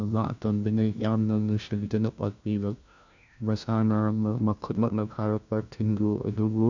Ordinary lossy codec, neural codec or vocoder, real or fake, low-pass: none; codec, 16 kHz, 1 kbps, FunCodec, trained on LibriTTS, 50 frames a second; fake; 7.2 kHz